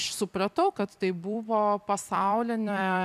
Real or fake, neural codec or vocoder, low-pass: fake; vocoder, 44.1 kHz, 128 mel bands every 256 samples, BigVGAN v2; 14.4 kHz